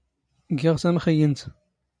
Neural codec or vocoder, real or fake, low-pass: none; real; 9.9 kHz